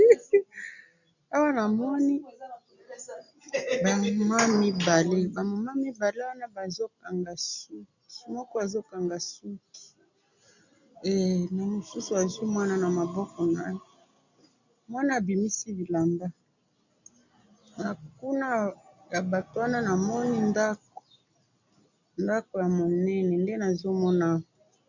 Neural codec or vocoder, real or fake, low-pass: none; real; 7.2 kHz